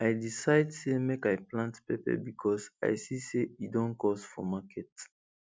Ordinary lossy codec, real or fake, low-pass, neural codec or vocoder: none; real; none; none